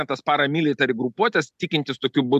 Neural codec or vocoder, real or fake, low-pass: none; real; 14.4 kHz